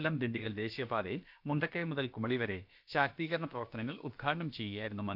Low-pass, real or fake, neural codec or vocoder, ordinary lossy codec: 5.4 kHz; fake; codec, 16 kHz, about 1 kbps, DyCAST, with the encoder's durations; none